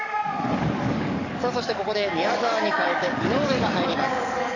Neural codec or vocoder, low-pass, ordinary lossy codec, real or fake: codec, 44.1 kHz, 7.8 kbps, DAC; 7.2 kHz; none; fake